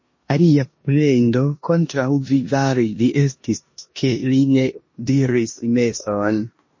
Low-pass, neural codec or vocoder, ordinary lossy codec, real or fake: 7.2 kHz; codec, 16 kHz in and 24 kHz out, 0.9 kbps, LongCat-Audio-Codec, four codebook decoder; MP3, 32 kbps; fake